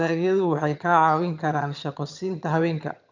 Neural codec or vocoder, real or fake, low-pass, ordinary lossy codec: vocoder, 22.05 kHz, 80 mel bands, HiFi-GAN; fake; 7.2 kHz; none